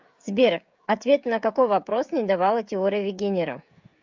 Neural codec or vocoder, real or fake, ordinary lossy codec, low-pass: codec, 16 kHz, 16 kbps, FreqCodec, smaller model; fake; MP3, 64 kbps; 7.2 kHz